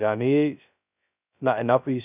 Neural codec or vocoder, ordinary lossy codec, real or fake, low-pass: codec, 16 kHz, 0.2 kbps, FocalCodec; none; fake; 3.6 kHz